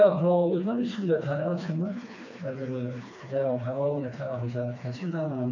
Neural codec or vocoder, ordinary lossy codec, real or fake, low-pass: codec, 16 kHz, 2 kbps, FreqCodec, smaller model; none; fake; 7.2 kHz